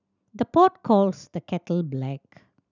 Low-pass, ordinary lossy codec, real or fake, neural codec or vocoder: 7.2 kHz; none; real; none